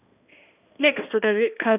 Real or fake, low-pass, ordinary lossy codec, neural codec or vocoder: fake; 3.6 kHz; none; codec, 16 kHz, 1 kbps, X-Codec, HuBERT features, trained on balanced general audio